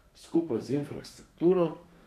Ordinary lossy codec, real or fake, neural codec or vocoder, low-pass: none; fake; codec, 32 kHz, 1.9 kbps, SNAC; 14.4 kHz